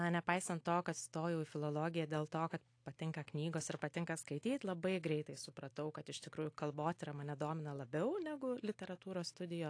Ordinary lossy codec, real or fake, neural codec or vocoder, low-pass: AAC, 48 kbps; real; none; 9.9 kHz